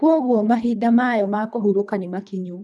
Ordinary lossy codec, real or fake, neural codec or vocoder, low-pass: none; fake; codec, 24 kHz, 3 kbps, HILCodec; none